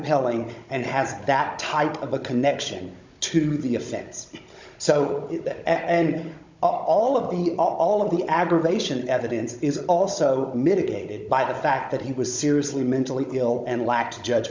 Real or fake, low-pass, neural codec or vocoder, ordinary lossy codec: fake; 7.2 kHz; codec, 16 kHz, 16 kbps, FunCodec, trained on Chinese and English, 50 frames a second; MP3, 64 kbps